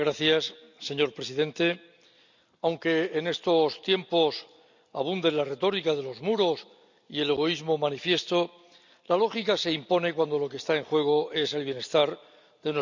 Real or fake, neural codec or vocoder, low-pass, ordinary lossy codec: real; none; 7.2 kHz; none